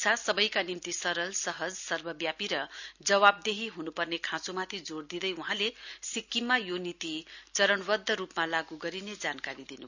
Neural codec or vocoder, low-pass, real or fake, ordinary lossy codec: none; 7.2 kHz; real; none